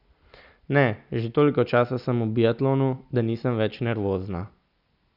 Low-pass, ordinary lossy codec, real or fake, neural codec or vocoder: 5.4 kHz; none; real; none